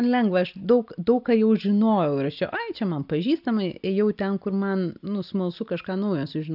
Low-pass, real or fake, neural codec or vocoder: 5.4 kHz; real; none